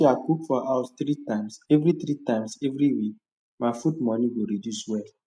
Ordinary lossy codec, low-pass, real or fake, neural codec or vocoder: none; none; real; none